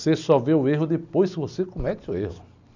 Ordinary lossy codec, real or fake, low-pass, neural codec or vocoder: none; real; 7.2 kHz; none